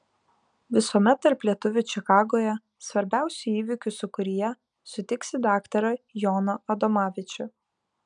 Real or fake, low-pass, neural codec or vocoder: real; 9.9 kHz; none